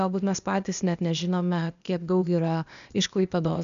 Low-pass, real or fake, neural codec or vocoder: 7.2 kHz; fake; codec, 16 kHz, 0.8 kbps, ZipCodec